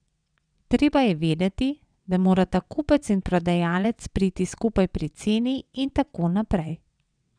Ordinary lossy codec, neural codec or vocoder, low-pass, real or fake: none; vocoder, 22.05 kHz, 80 mel bands, WaveNeXt; 9.9 kHz; fake